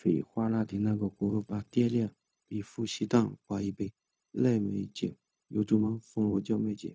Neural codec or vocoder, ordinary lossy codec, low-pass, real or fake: codec, 16 kHz, 0.4 kbps, LongCat-Audio-Codec; none; none; fake